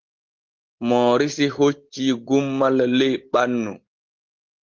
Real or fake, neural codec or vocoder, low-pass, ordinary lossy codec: real; none; 7.2 kHz; Opus, 16 kbps